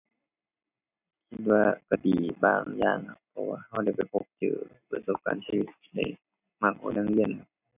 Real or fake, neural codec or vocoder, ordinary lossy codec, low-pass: real; none; none; 3.6 kHz